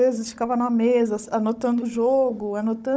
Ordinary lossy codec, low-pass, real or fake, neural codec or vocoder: none; none; fake; codec, 16 kHz, 16 kbps, FunCodec, trained on Chinese and English, 50 frames a second